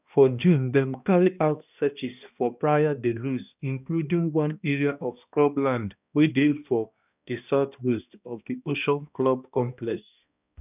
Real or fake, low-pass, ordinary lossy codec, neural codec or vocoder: fake; 3.6 kHz; none; codec, 16 kHz, 1 kbps, X-Codec, HuBERT features, trained on balanced general audio